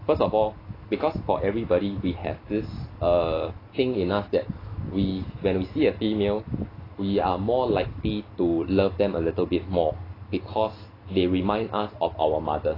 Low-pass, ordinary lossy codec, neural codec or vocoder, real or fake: 5.4 kHz; AAC, 24 kbps; none; real